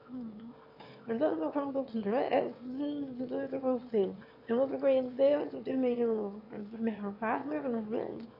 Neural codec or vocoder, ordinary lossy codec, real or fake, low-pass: autoencoder, 22.05 kHz, a latent of 192 numbers a frame, VITS, trained on one speaker; AAC, 32 kbps; fake; 5.4 kHz